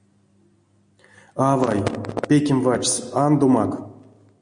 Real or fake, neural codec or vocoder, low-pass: real; none; 9.9 kHz